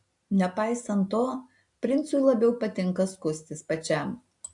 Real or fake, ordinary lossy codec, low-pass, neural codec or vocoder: real; AAC, 64 kbps; 10.8 kHz; none